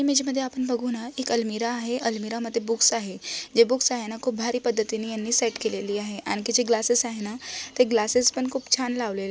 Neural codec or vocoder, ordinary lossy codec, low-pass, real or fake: none; none; none; real